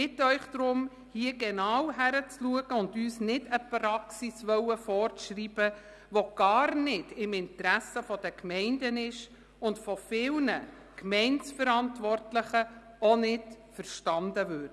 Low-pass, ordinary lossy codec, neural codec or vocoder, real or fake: none; none; none; real